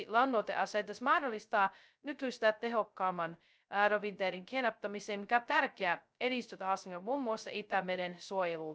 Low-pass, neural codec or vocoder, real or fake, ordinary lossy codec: none; codec, 16 kHz, 0.2 kbps, FocalCodec; fake; none